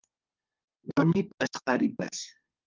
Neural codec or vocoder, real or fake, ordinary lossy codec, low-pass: codec, 32 kHz, 1.9 kbps, SNAC; fake; Opus, 24 kbps; 7.2 kHz